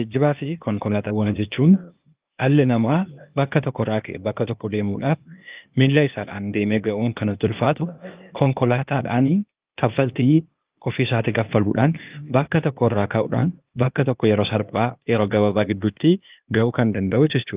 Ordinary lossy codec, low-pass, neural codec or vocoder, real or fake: Opus, 32 kbps; 3.6 kHz; codec, 16 kHz, 0.8 kbps, ZipCodec; fake